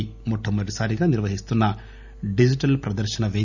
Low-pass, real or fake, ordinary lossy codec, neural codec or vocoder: 7.2 kHz; real; none; none